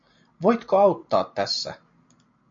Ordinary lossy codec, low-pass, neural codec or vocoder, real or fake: MP3, 48 kbps; 7.2 kHz; none; real